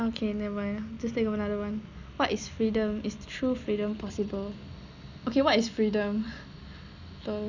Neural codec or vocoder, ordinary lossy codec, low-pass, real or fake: none; none; 7.2 kHz; real